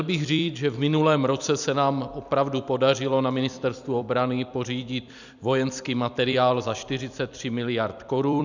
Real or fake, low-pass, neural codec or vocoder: fake; 7.2 kHz; vocoder, 44.1 kHz, 128 mel bands every 256 samples, BigVGAN v2